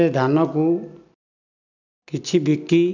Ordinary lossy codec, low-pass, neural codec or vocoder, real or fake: none; 7.2 kHz; none; real